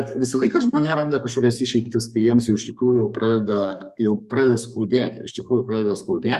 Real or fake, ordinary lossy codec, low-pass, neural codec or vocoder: fake; Opus, 64 kbps; 14.4 kHz; codec, 32 kHz, 1.9 kbps, SNAC